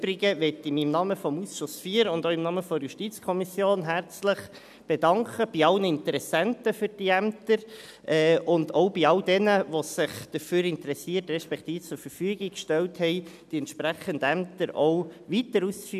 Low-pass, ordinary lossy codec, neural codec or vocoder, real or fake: 14.4 kHz; none; none; real